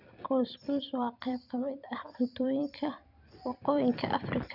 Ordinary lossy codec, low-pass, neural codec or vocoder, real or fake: none; 5.4 kHz; vocoder, 44.1 kHz, 128 mel bands every 256 samples, BigVGAN v2; fake